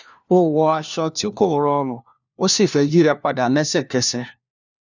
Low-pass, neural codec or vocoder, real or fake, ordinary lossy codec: 7.2 kHz; codec, 16 kHz, 1 kbps, FunCodec, trained on LibriTTS, 50 frames a second; fake; none